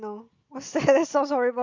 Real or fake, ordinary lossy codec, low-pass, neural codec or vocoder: real; Opus, 64 kbps; 7.2 kHz; none